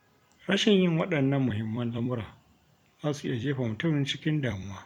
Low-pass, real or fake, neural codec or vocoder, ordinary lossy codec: 19.8 kHz; fake; vocoder, 44.1 kHz, 128 mel bands every 256 samples, BigVGAN v2; none